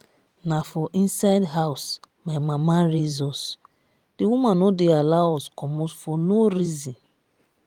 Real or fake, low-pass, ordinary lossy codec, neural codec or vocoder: fake; 19.8 kHz; Opus, 32 kbps; vocoder, 44.1 kHz, 128 mel bands every 512 samples, BigVGAN v2